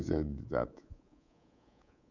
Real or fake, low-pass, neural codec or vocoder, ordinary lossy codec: fake; 7.2 kHz; codec, 24 kHz, 3.1 kbps, DualCodec; none